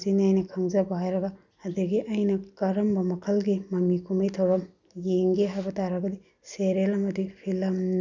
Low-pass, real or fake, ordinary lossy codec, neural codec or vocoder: 7.2 kHz; real; none; none